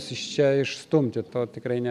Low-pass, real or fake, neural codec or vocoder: 14.4 kHz; real; none